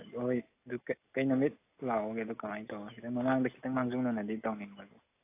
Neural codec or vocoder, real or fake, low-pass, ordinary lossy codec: none; real; 3.6 kHz; AAC, 24 kbps